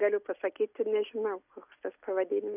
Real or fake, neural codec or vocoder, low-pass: real; none; 3.6 kHz